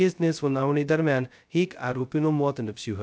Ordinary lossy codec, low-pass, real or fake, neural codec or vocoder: none; none; fake; codec, 16 kHz, 0.2 kbps, FocalCodec